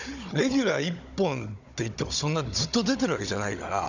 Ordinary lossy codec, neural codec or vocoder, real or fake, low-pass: none; codec, 16 kHz, 16 kbps, FunCodec, trained on Chinese and English, 50 frames a second; fake; 7.2 kHz